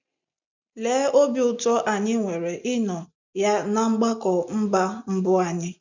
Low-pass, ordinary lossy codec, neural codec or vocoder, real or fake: 7.2 kHz; none; none; real